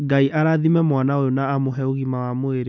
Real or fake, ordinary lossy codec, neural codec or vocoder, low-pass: real; none; none; none